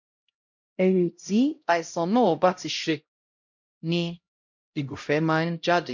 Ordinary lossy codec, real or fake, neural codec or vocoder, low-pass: MP3, 48 kbps; fake; codec, 16 kHz, 0.5 kbps, X-Codec, HuBERT features, trained on LibriSpeech; 7.2 kHz